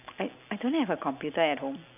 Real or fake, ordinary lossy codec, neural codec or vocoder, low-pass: real; none; none; 3.6 kHz